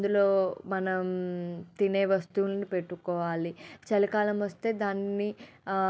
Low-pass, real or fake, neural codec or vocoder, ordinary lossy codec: none; real; none; none